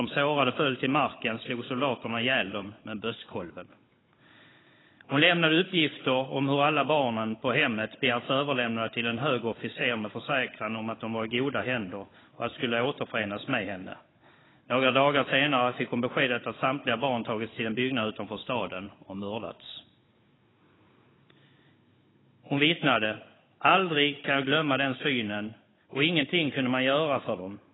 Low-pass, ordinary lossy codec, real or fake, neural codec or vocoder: 7.2 kHz; AAC, 16 kbps; fake; codec, 16 kHz, 16 kbps, FunCodec, trained on Chinese and English, 50 frames a second